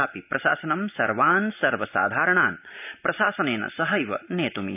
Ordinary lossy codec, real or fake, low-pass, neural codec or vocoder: none; real; 3.6 kHz; none